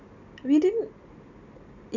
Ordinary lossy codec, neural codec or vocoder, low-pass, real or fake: none; none; 7.2 kHz; real